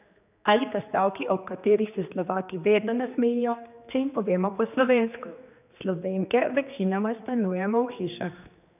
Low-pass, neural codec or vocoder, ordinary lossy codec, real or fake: 3.6 kHz; codec, 16 kHz, 2 kbps, X-Codec, HuBERT features, trained on general audio; none; fake